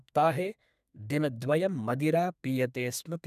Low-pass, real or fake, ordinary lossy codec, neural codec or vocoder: 14.4 kHz; fake; none; codec, 32 kHz, 1.9 kbps, SNAC